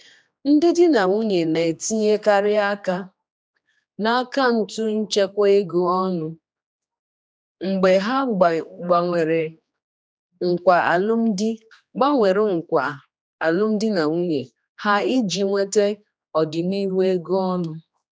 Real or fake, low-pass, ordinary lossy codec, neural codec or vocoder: fake; none; none; codec, 16 kHz, 2 kbps, X-Codec, HuBERT features, trained on general audio